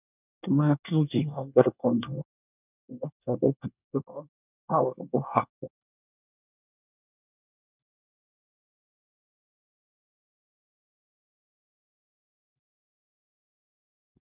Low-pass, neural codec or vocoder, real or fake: 3.6 kHz; codec, 24 kHz, 1 kbps, SNAC; fake